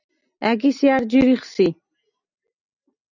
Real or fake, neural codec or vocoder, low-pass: real; none; 7.2 kHz